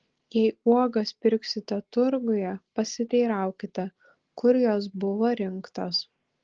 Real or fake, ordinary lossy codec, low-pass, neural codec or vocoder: real; Opus, 16 kbps; 7.2 kHz; none